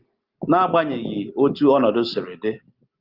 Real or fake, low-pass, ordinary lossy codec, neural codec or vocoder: real; 5.4 kHz; Opus, 24 kbps; none